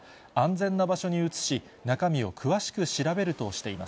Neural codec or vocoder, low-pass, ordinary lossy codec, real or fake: none; none; none; real